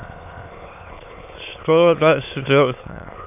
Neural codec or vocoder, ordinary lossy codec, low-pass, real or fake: autoencoder, 22.05 kHz, a latent of 192 numbers a frame, VITS, trained on many speakers; AAC, 32 kbps; 3.6 kHz; fake